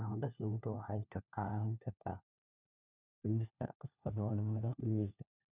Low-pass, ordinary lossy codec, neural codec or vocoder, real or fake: 3.6 kHz; none; codec, 16 kHz, 1 kbps, FunCodec, trained on LibriTTS, 50 frames a second; fake